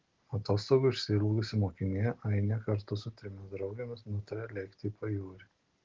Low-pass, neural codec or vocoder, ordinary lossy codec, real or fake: 7.2 kHz; none; Opus, 16 kbps; real